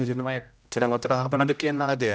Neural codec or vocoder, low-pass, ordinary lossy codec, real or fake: codec, 16 kHz, 0.5 kbps, X-Codec, HuBERT features, trained on general audio; none; none; fake